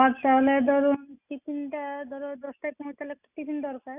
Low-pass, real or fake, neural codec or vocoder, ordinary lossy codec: 3.6 kHz; real; none; none